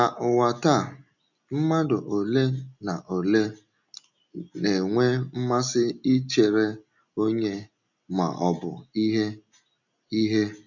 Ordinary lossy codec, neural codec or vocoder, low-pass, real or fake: none; none; 7.2 kHz; real